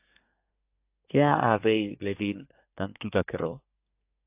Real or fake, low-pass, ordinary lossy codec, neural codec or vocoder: fake; 3.6 kHz; AAC, 32 kbps; codec, 24 kHz, 1 kbps, SNAC